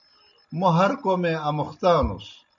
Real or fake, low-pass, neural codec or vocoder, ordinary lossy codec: real; 7.2 kHz; none; MP3, 96 kbps